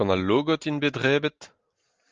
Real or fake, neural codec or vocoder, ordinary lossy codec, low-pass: real; none; Opus, 24 kbps; 7.2 kHz